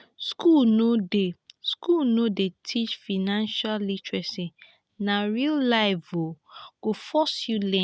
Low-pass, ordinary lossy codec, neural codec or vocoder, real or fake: none; none; none; real